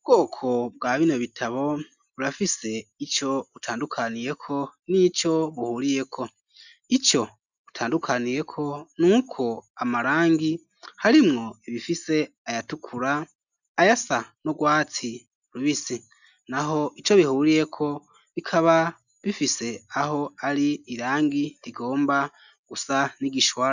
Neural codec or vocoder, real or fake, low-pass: none; real; 7.2 kHz